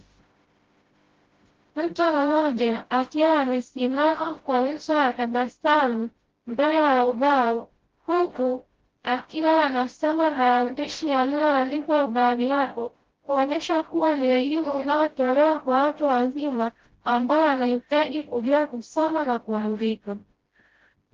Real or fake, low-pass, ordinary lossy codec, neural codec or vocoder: fake; 7.2 kHz; Opus, 16 kbps; codec, 16 kHz, 0.5 kbps, FreqCodec, smaller model